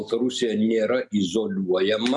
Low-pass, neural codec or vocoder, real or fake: 10.8 kHz; none; real